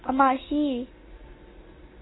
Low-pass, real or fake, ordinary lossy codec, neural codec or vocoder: 7.2 kHz; real; AAC, 16 kbps; none